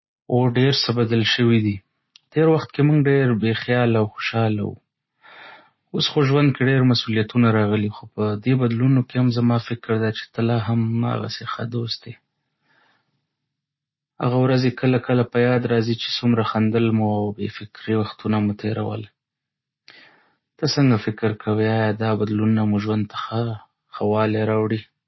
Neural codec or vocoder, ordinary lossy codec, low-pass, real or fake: none; MP3, 24 kbps; 7.2 kHz; real